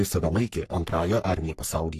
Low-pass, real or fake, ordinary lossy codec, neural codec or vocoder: 14.4 kHz; fake; AAC, 64 kbps; codec, 44.1 kHz, 3.4 kbps, Pupu-Codec